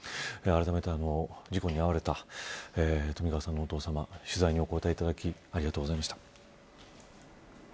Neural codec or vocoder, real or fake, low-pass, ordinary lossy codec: none; real; none; none